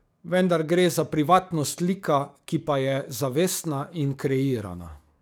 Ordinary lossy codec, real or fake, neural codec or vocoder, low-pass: none; fake; codec, 44.1 kHz, 7.8 kbps, DAC; none